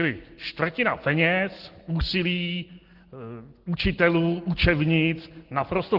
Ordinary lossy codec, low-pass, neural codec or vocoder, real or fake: Opus, 16 kbps; 5.4 kHz; none; real